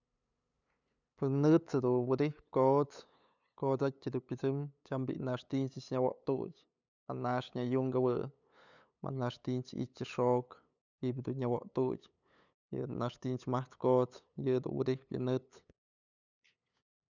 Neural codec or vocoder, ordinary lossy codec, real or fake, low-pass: codec, 16 kHz, 8 kbps, FunCodec, trained on LibriTTS, 25 frames a second; none; fake; 7.2 kHz